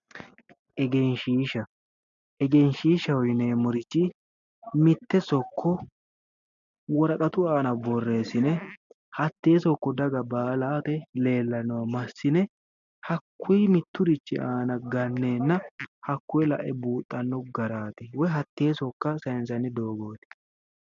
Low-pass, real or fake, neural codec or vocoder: 7.2 kHz; real; none